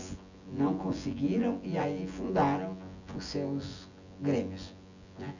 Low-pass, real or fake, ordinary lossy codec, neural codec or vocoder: 7.2 kHz; fake; none; vocoder, 24 kHz, 100 mel bands, Vocos